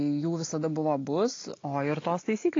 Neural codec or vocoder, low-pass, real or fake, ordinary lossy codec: none; 7.2 kHz; real; AAC, 32 kbps